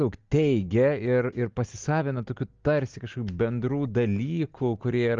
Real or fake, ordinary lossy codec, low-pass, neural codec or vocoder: real; Opus, 32 kbps; 7.2 kHz; none